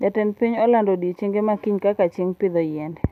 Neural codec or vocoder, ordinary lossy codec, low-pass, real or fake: none; none; 14.4 kHz; real